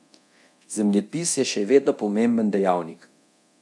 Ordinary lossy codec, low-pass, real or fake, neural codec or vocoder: none; none; fake; codec, 24 kHz, 0.9 kbps, DualCodec